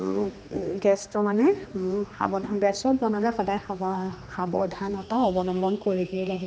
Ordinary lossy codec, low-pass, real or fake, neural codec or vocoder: none; none; fake; codec, 16 kHz, 2 kbps, X-Codec, HuBERT features, trained on general audio